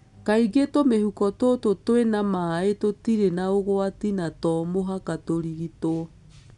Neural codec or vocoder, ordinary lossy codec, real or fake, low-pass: none; none; real; 10.8 kHz